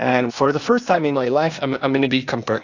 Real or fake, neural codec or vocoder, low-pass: fake; codec, 16 kHz, 0.8 kbps, ZipCodec; 7.2 kHz